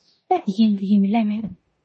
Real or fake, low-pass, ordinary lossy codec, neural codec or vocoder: fake; 10.8 kHz; MP3, 32 kbps; codec, 16 kHz in and 24 kHz out, 0.9 kbps, LongCat-Audio-Codec, four codebook decoder